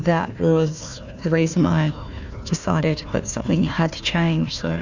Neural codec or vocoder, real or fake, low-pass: codec, 16 kHz, 1 kbps, FunCodec, trained on Chinese and English, 50 frames a second; fake; 7.2 kHz